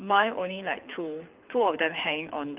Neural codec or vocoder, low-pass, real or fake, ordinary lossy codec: codec, 24 kHz, 6 kbps, HILCodec; 3.6 kHz; fake; Opus, 32 kbps